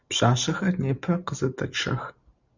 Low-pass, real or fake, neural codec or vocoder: 7.2 kHz; real; none